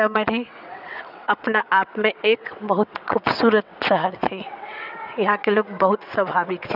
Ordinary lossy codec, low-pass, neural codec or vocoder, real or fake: none; 5.4 kHz; vocoder, 22.05 kHz, 80 mel bands, WaveNeXt; fake